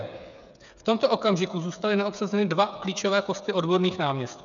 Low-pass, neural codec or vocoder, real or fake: 7.2 kHz; codec, 16 kHz, 8 kbps, FreqCodec, smaller model; fake